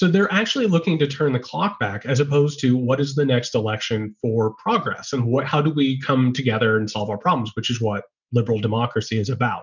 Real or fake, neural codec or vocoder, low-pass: real; none; 7.2 kHz